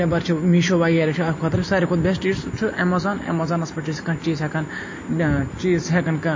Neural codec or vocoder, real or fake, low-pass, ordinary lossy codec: none; real; 7.2 kHz; MP3, 32 kbps